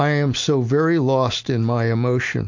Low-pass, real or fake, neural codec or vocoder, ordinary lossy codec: 7.2 kHz; real; none; MP3, 48 kbps